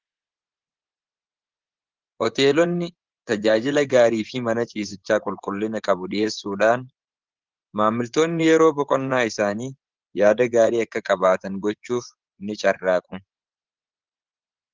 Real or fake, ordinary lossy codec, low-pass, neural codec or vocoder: fake; Opus, 16 kbps; 7.2 kHz; vocoder, 24 kHz, 100 mel bands, Vocos